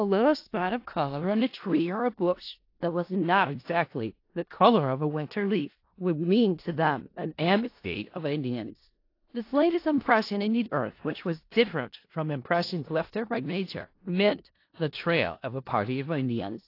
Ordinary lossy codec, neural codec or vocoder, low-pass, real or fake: AAC, 32 kbps; codec, 16 kHz in and 24 kHz out, 0.4 kbps, LongCat-Audio-Codec, four codebook decoder; 5.4 kHz; fake